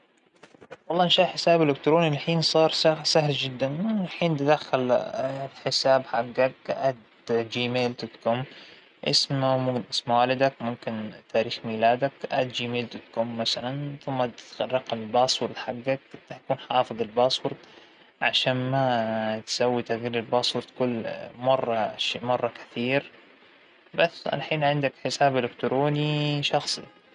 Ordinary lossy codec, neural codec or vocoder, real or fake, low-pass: none; none; real; 9.9 kHz